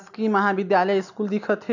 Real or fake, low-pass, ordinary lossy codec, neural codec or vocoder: real; 7.2 kHz; none; none